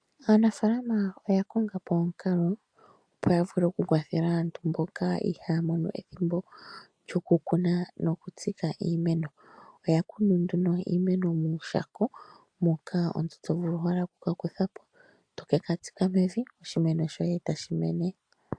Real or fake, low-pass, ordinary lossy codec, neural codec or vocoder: real; 9.9 kHz; AAC, 64 kbps; none